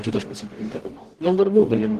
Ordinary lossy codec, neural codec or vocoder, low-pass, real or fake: Opus, 16 kbps; codec, 44.1 kHz, 0.9 kbps, DAC; 14.4 kHz; fake